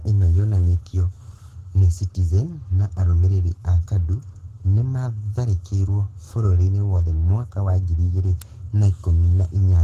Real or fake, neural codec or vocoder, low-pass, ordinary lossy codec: fake; codec, 44.1 kHz, 7.8 kbps, Pupu-Codec; 14.4 kHz; Opus, 16 kbps